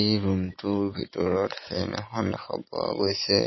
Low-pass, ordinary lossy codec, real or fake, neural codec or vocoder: 7.2 kHz; MP3, 24 kbps; real; none